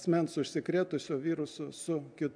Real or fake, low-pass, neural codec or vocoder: real; 9.9 kHz; none